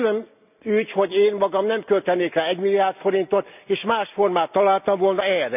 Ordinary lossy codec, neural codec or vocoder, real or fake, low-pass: none; none; real; 3.6 kHz